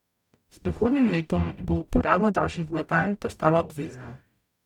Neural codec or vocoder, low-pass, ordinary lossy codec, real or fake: codec, 44.1 kHz, 0.9 kbps, DAC; 19.8 kHz; none; fake